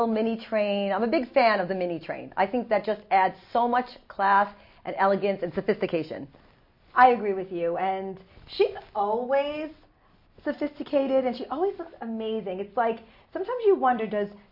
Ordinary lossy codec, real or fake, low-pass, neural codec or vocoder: MP3, 32 kbps; real; 5.4 kHz; none